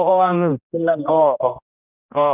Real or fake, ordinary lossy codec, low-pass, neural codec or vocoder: fake; none; 3.6 kHz; codec, 16 kHz, 1 kbps, X-Codec, HuBERT features, trained on general audio